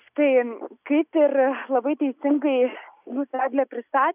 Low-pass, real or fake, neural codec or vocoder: 3.6 kHz; real; none